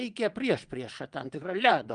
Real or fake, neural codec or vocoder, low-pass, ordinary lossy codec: fake; vocoder, 22.05 kHz, 80 mel bands, WaveNeXt; 9.9 kHz; Opus, 32 kbps